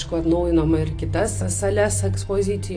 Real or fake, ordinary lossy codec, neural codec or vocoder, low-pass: real; AAC, 48 kbps; none; 9.9 kHz